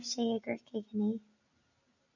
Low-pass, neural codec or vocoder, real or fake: 7.2 kHz; none; real